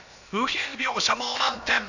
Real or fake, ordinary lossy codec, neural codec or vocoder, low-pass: fake; none; codec, 16 kHz, about 1 kbps, DyCAST, with the encoder's durations; 7.2 kHz